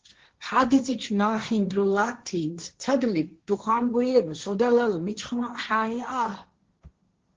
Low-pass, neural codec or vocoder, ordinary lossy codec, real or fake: 7.2 kHz; codec, 16 kHz, 1.1 kbps, Voila-Tokenizer; Opus, 16 kbps; fake